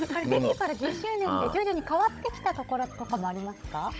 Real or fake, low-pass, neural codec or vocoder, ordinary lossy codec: fake; none; codec, 16 kHz, 16 kbps, FunCodec, trained on Chinese and English, 50 frames a second; none